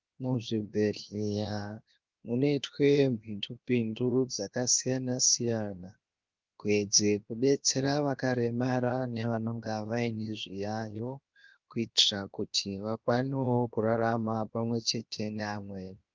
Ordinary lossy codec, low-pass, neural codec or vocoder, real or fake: Opus, 32 kbps; 7.2 kHz; codec, 16 kHz, 0.7 kbps, FocalCodec; fake